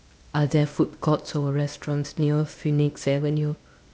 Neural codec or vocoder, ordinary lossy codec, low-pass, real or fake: codec, 16 kHz, 0.8 kbps, ZipCodec; none; none; fake